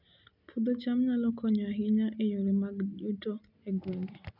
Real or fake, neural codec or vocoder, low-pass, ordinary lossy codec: real; none; 5.4 kHz; none